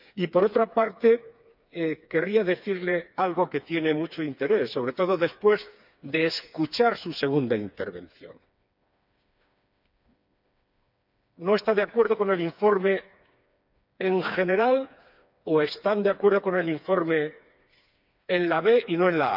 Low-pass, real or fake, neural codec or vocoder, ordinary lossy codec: 5.4 kHz; fake; codec, 16 kHz, 4 kbps, FreqCodec, smaller model; none